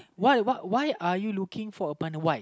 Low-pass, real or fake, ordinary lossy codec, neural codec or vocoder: none; real; none; none